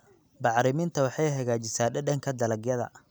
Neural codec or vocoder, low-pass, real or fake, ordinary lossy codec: none; none; real; none